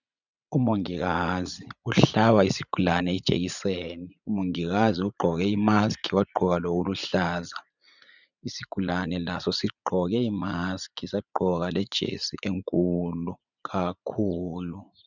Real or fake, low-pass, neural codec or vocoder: fake; 7.2 kHz; vocoder, 44.1 kHz, 80 mel bands, Vocos